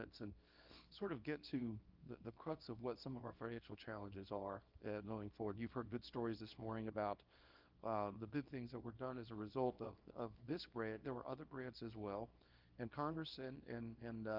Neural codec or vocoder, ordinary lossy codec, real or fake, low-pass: codec, 24 kHz, 0.9 kbps, WavTokenizer, small release; Opus, 24 kbps; fake; 5.4 kHz